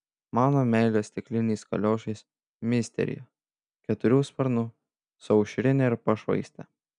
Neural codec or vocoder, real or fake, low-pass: none; real; 9.9 kHz